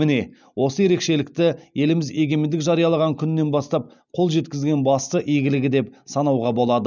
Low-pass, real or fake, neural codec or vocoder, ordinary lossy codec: 7.2 kHz; real; none; none